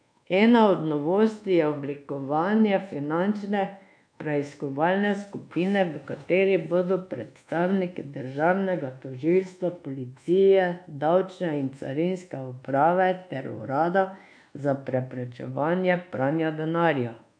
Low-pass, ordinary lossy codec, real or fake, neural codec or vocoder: 9.9 kHz; none; fake; codec, 24 kHz, 1.2 kbps, DualCodec